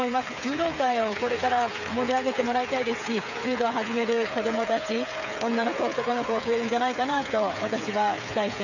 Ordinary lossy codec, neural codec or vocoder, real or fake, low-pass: none; codec, 16 kHz, 8 kbps, FreqCodec, smaller model; fake; 7.2 kHz